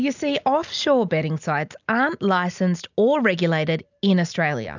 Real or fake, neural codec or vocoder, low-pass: real; none; 7.2 kHz